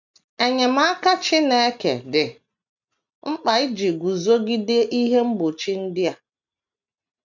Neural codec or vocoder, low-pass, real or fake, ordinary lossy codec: none; 7.2 kHz; real; none